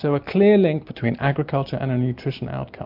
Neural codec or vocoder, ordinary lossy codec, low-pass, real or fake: none; MP3, 48 kbps; 5.4 kHz; real